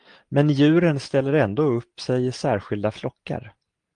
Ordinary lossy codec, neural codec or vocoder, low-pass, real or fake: Opus, 24 kbps; none; 9.9 kHz; real